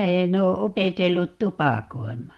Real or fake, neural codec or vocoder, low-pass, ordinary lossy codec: fake; vocoder, 44.1 kHz, 128 mel bands, Pupu-Vocoder; 19.8 kHz; Opus, 24 kbps